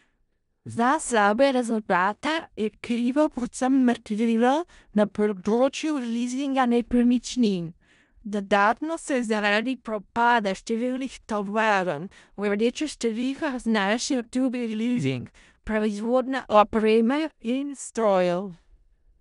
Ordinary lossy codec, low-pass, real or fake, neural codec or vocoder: none; 10.8 kHz; fake; codec, 16 kHz in and 24 kHz out, 0.4 kbps, LongCat-Audio-Codec, four codebook decoder